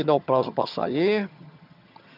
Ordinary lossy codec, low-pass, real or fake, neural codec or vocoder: none; 5.4 kHz; fake; vocoder, 22.05 kHz, 80 mel bands, HiFi-GAN